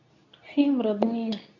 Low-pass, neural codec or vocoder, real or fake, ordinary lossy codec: 7.2 kHz; codec, 24 kHz, 0.9 kbps, WavTokenizer, medium speech release version 2; fake; none